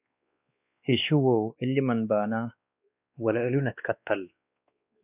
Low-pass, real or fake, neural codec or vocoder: 3.6 kHz; fake; codec, 16 kHz, 2 kbps, X-Codec, WavLM features, trained on Multilingual LibriSpeech